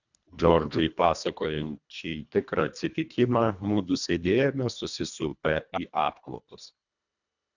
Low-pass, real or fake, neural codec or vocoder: 7.2 kHz; fake; codec, 24 kHz, 1.5 kbps, HILCodec